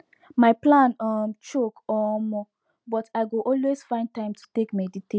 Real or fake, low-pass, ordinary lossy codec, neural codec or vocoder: real; none; none; none